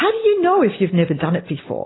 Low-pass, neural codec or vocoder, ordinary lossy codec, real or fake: 7.2 kHz; none; AAC, 16 kbps; real